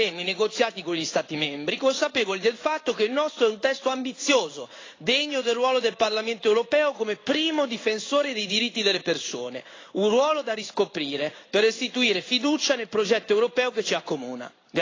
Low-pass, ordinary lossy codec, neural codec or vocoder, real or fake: 7.2 kHz; AAC, 32 kbps; codec, 16 kHz in and 24 kHz out, 1 kbps, XY-Tokenizer; fake